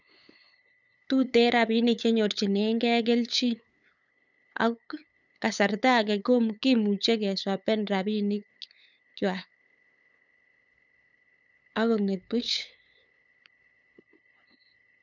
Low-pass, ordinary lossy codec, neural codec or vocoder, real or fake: 7.2 kHz; none; codec, 16 kHz, 8 kbps, FunCodec, trained on LibriTTS, 25 frames a second; fake